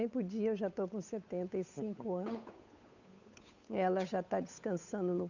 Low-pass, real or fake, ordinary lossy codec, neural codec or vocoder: 7.2 kHz; fake; none; codec, 16 kHz, 8 kbps, FunCodec, trained on Chinese and English, 25 frames a second